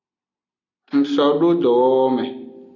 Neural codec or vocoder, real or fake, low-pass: none; real; 7.2 kHz